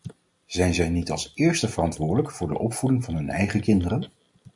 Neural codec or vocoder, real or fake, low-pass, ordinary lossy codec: none; real; 10.8 kHz; MP3, 64 kbps